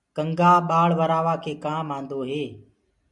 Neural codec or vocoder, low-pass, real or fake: none; 10.8 kHz; real